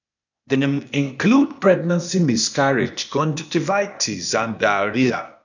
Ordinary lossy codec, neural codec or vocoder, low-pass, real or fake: none; codec, 16 kHz, 0.8 kbps, ZipCodec; 7.2 kHz; fake